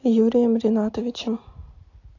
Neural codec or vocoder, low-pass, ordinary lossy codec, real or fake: none; 7.2 kHz; AAC, 48 kbps; real